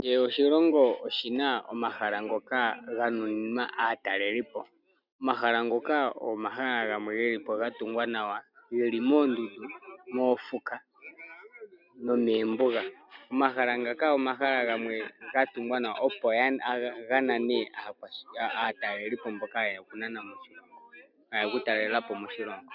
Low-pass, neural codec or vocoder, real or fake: 5.4 kHz; none; real